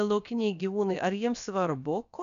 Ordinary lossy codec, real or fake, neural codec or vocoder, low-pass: MP3, 96 kbps; fake; codec, 16 kHz, about 1 kbps, DyCAST, with the encoder's durations; 7.2 kHz